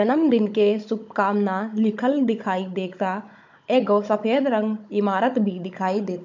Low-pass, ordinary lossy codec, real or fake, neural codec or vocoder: 7.2 kHz; MP3, 48 kbps; fake; codec, 16 kHz, 16 kbps, FunCodec, trained on Chinese and English, 50 frames a second